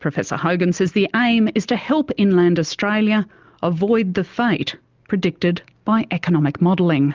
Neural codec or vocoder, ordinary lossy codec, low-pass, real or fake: none; Opus, 24 kbps; 7.2 kHz; real